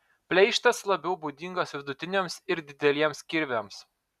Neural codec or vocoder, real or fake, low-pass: none; real; 14.4 kHz